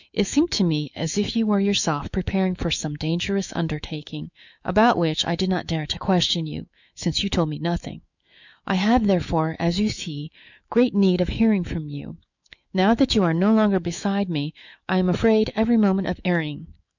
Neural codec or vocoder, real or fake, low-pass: autoencoder, 48 kHz, 128 numbers a frame, DAC-VAE, trained on Japanese speech; fake; 7.2 kHz